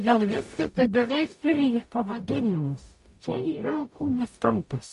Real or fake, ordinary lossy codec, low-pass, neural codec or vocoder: fake; MP3, 48 kbps; 14.4 kHz; codec, 44.1 kHz, 0.9 kbps, DAC